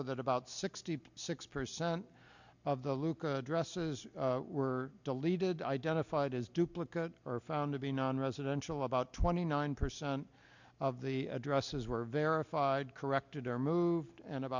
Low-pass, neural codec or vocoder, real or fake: 7.2 kHz; none; real